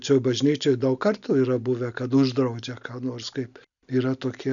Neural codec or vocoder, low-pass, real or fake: none; 7.2 kHz; real